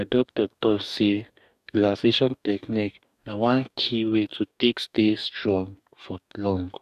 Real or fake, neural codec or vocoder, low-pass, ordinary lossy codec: fake; codec, 44.1 kHz, 2.6 kbps, DAC; 14.4 kHz; none